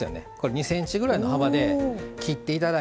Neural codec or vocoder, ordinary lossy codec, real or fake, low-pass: none; none; real; none